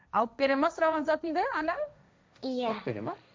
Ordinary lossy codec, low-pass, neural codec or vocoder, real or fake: none; none; codec, 16 kHz, 1.1 kbps, Voila-Tokenizer; fake